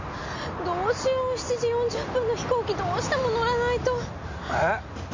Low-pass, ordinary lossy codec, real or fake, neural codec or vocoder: 7.2 kHz; MP3, 48 kbps; real; none